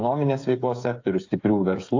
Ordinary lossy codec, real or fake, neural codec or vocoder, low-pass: AAC, 48 kbps; fake; codec, 16 kHz, 8 kbps, FreqCodec, smaller model; 7.2 kHz